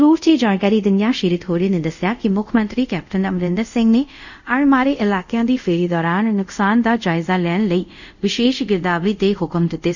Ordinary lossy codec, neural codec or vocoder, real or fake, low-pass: none; codec, 24 kHz, 0.5 kbps, DualCodec; fake; 7.2 kHz